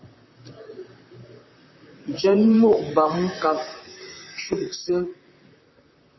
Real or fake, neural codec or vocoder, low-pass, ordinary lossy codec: fake; vocoder, 44.1 kHz, 128 mel bands, Pupu-Vocoder; 7.2 kHz; MP3, 24 kbps